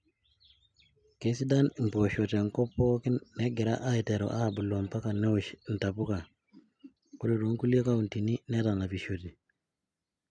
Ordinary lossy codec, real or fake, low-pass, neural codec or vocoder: none; real; 9.9 kHz; none